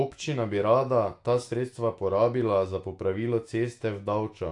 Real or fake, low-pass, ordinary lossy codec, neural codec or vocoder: fake; 10.8 kHz; AAC, 48 kbps; autoencoder, 48 kHz, 128 numbers a frame, DAC-VAE, trained on Japanese speech